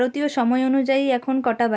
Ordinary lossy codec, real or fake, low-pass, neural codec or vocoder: none; real; none; none